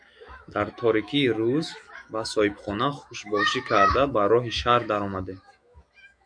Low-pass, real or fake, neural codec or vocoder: 9.9 kHz; fake; autoencoder, 48 kHz, 128 numbers a frame, DAC-VAE, trained on Japanese speech